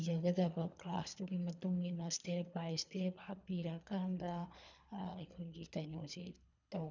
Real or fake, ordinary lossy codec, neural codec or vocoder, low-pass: fake; none; codec, 24 kHz, 3 kbps, HILCodec; 7.2 kHz